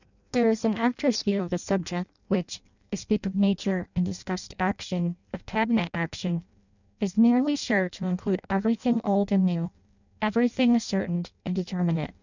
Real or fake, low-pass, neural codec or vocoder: fake; 7.2 kHz; codec, 16 kHz in and 24 kHz out, 0.6 kbps, FireRedTTS-2 codec